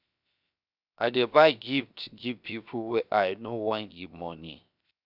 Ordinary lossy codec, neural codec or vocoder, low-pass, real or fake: none; codec, 16 kHz, 0.7 kbps, FocalCodec; 5.4 kHz; fake